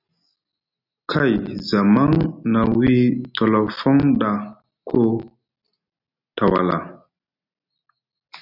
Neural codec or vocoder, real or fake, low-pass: none; real; 5.4 kHz